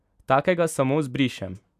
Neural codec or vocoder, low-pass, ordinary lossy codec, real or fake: none; 14.4 kHz; none; real